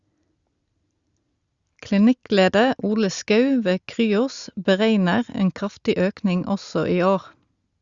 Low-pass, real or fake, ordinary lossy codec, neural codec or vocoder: 7.2 kHz; real; Opus, 64 kbps; none